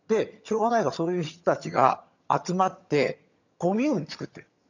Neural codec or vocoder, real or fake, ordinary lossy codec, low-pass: vocoder, 22.05 kHz, 80 mel bands, HiFi-GAN; fake; none; 7.2 kHz